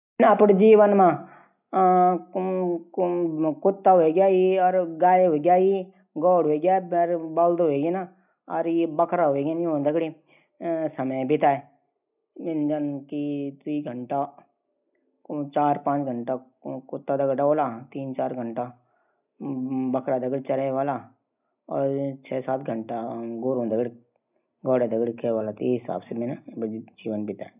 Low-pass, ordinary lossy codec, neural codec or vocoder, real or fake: 3.6 kHz; none; none; real